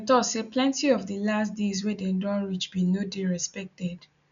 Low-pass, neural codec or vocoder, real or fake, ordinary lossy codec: 7.2 kHz; none; real; none